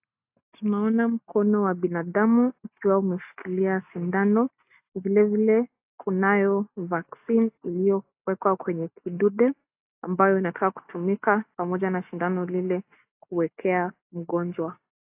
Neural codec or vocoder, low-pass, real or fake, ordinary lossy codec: none; 3.6 kHz; real; AAC, 32 kbps